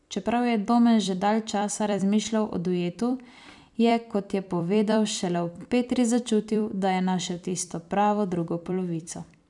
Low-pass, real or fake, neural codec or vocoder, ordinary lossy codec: 10.8 kHz; fake; vocoder, 44.1 kHz, 128 mel bands every 256 samples, BigVGAN v2; none